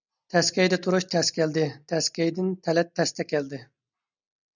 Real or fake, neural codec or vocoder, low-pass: real; none; 7.2 kHz